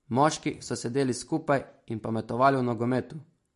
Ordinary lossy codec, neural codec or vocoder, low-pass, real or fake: MP3, 48 kbps; none; 14.4 kHz; real